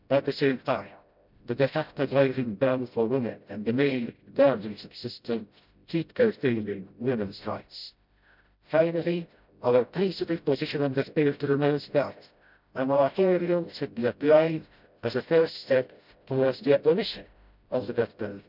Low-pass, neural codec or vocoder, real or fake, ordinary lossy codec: 5.4 kHz; codec, 16 kHz, 0.5 kbps, FreqCodec, smaller model; fake; none